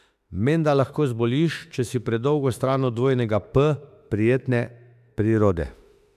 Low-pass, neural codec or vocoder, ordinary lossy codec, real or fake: 14.4 kHz; autoencoder, 48 kHz, 32 numbers a frame, DAC-VAE, trained on Japanese speech; AAC, 96 kbps; fake